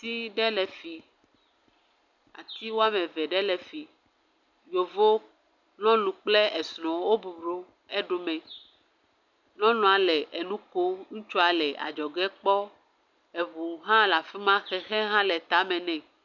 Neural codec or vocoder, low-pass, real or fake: none; 7.2 kHz; real